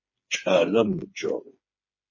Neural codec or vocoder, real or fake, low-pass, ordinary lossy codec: codec, 16 kHz, 4 kbps, FreqCodec, smaller model; fake; 7.2 kHz; MP3, 32 kbps